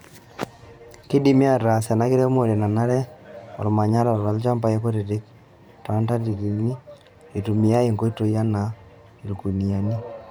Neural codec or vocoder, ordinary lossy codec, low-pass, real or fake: vocoder, 44.1 kHz, 128 mel bands every 512 samples, BigVGAN v2; none; none; fake